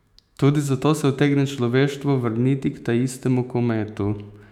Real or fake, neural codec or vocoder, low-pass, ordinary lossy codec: fake; autoencoder, 48 kHz, 128 numbers a frame, DAC-VAE, trained on Japanese speech; 19.8 kHz; none